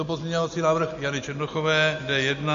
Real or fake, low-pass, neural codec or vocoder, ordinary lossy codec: real; 7.2 kHz; none; MP3, 48 kbps